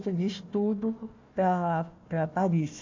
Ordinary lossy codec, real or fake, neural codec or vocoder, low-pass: MP3, 48 kbps; fake; codec, 16 kHz, 1 kbps, FunCodec, trained on Chinese and English, 50 frames a second; 7.2 kHz